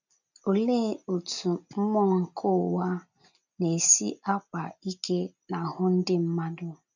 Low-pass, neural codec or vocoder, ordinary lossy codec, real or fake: 7.2 kHz; none; none; real